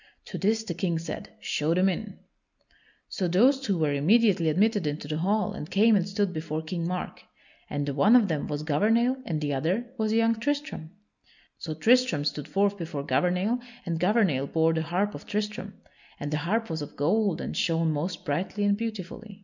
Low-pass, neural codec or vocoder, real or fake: 7.2 kHz; none; real